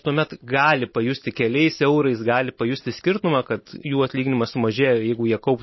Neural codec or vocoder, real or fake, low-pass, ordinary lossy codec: none; real; 7.2 kHz; MP3, 24 kbps